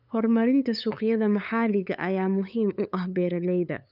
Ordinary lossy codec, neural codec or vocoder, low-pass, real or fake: none; codec, 16 kHz, 8 kbps, FunCodec, trained on LibriTTS, 25 frames a second; 5.4 kHz; fake